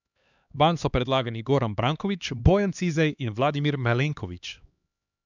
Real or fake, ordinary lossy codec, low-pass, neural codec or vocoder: fake; none; 7.2 kHz; codec, 16 kHz, 2 kbps, X-Codec, HuBERT features, trained on LibriSpeech